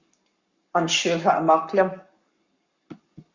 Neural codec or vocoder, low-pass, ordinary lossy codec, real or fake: codec, 44.1 kHz, 7.8 kbps, Pupu-Codec; 7.2 kHz; Opus, 64 kbps; fake